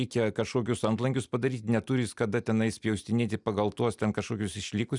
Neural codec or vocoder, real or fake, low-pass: none; real; 10.8 kHz